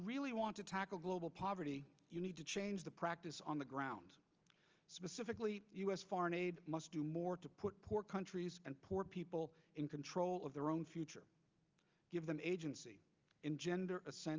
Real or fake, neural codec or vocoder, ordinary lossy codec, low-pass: real; none; Opus, 24 kbps; 7.2 kHz